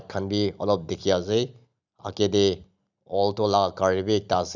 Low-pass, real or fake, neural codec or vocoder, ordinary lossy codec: 7.2 kHz; real; none; none